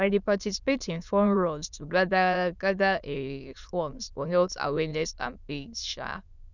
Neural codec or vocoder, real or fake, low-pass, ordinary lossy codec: autoencoder, 22.05 kHz, a latent of 192 numbers a frame, VITS, trained on many speakers; fake; 7.2 kHz; none